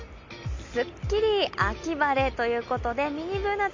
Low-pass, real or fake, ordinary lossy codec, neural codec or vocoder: 7.2 kHz; real; MP3, 48 kbps; none